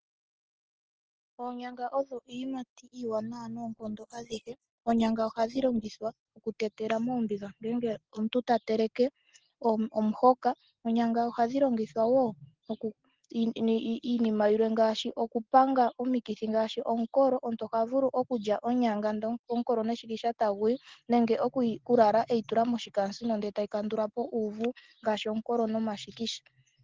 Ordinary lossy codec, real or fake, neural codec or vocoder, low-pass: Opus, 16 kbps; real; none; 7.2 kHz